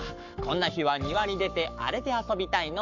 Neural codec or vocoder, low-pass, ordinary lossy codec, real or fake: codec, 44.1 kHz, 7.8 kbps, Pupu-Codec; 7.2 kHz; none; fake